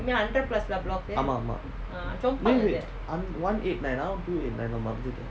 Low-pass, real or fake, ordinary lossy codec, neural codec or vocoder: none; real; none; none